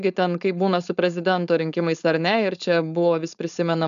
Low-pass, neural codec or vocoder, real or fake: 7.2 kHz; codec, 16 kHz, 4.8 kbps, FACodec; fake